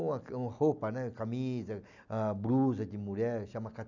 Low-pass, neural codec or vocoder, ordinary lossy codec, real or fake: 7.2 kHz; none; none; real